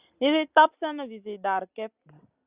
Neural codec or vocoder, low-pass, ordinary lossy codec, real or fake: none; 3.6 kHz; Opus, 64 kbps; real